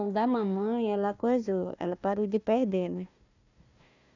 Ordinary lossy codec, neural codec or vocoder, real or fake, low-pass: none; codec, 16 kHz, 1 kbps, FunCodec, trained on Chinese and English, 50 frames a second; fake; 7.2 kHz